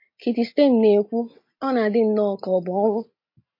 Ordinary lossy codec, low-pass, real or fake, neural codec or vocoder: MP3, 32 kbps; 5.4 kHz; real; none